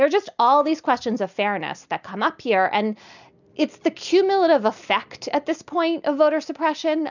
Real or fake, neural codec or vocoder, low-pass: real; none; 7.2 kHz